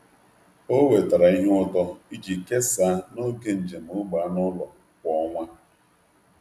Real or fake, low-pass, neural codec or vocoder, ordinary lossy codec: real; 14.4 kHz; none; none